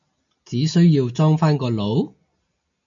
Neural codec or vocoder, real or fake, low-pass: none; real; 7.2 kHz